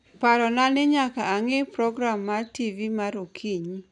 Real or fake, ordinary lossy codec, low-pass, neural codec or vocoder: real; none; 10.8 kHz; none